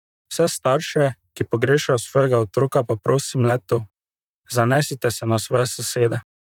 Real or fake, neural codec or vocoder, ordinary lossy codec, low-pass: fake; vocoder, 44.1 kHz, 128 mel bands, Pupu-Vocoder; none; 19.8 kHz